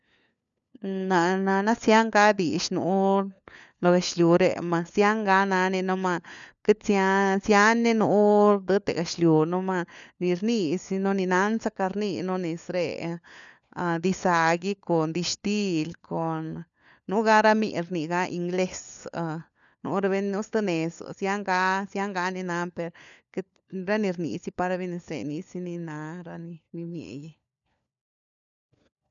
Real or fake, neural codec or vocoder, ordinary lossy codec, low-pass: fake; codec, 16 kHz, 4 kbps, FunCodec, trained on LibriTTS, 50 frames a second; none; 7.2 kHz